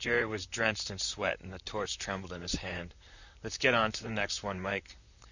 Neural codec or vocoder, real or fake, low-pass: vocoder, 44.1 kHz, 128 mel bands, Pupu-Vocoder; fake; 7.2 kHz